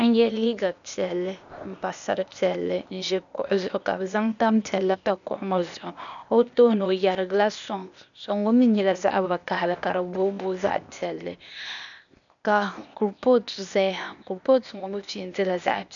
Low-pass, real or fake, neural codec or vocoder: 7.2 kHz; fake; codec, 16 kHz, 0.8 kbps, ZipCodec